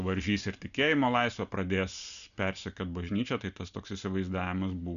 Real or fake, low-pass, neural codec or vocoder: real; 7.2 kHz; none